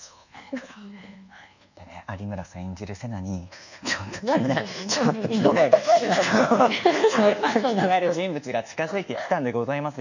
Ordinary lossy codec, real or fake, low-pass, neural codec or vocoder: none; fake; 7.2 kHz; codec, 24 kHz, 1.2 kbps, DualCodec